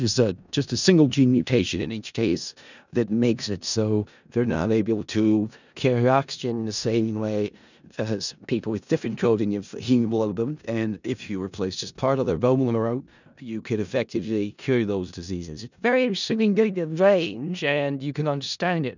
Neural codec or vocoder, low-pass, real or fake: codec, 16 kHz in and 24 kHz out, 0.4 kbps, LongCat-Audio-Codec, four codebook decoder; 7.2 kHz; fake